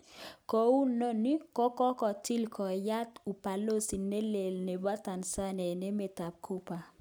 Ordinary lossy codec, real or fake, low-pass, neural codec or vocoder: none; real; none; none